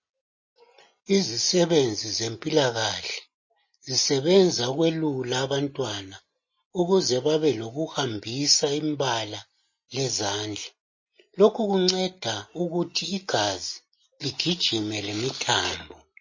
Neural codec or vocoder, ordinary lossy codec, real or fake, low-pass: none; MP3, 32 kbps; real; 7.2 kHz